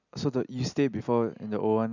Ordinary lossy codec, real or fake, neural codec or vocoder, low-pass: none; real; none; 7.2 kHz